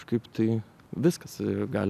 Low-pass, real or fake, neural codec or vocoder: 14.4 kHz; real; none